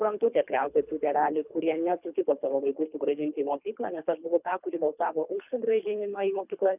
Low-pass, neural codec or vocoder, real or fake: 3.6 kHz; codec, 24 kHz, 3 kbps, HILCodec; fake